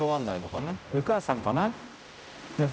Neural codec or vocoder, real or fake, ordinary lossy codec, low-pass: codec, 16 kHz, 0.5 kbps, X-Codec, HuBERT features, trained on general audio; fake; none; none